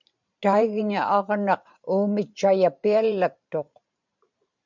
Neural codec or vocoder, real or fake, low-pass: vocoder, 44.1 kHz, 128 mel bands every 512 samples, BigVGAN v2; fake; 7.2 kHz